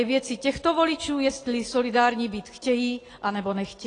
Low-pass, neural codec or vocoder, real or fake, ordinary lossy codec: 9.9 kHz; none; real; AAC, 32 kbps